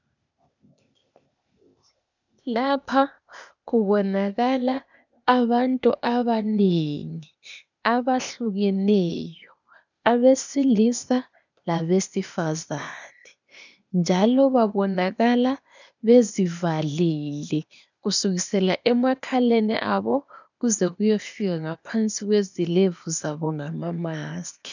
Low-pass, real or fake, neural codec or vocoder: 7.2 kHz; fake; codec, 16 kHz, 0.8 kbps, ZipCodec